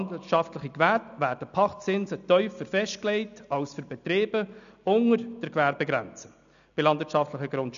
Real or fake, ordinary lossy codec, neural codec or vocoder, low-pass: real; none; none; 7.2 kHz